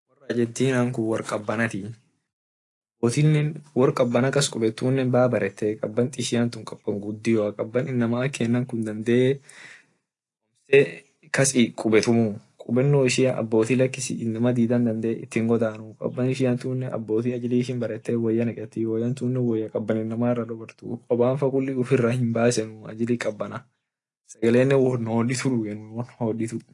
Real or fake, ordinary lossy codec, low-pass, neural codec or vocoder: real; AAC, 48 kbps; 10.8 kHz; none